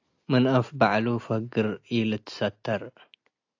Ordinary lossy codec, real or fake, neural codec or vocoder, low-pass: AAC, 48 kbps; real; none; 7.2 kHz